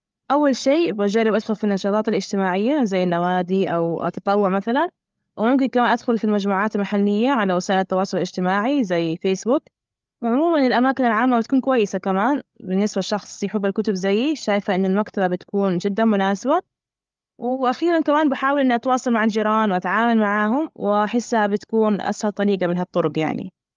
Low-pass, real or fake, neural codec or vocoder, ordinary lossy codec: 7.2 kHz; fake; codec, 16 kHz, 8 kbps, FreqCodec, larger model; Opus, 32 kbps